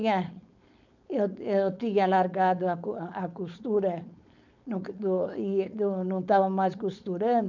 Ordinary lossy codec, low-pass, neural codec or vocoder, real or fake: none; 7.2 kHz; codec, 16 kHz, 4.8 kbps, FACodec; fake